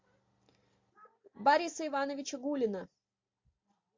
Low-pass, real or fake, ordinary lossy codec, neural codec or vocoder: 7.2 kHz; real; MP3, 48 kbps; none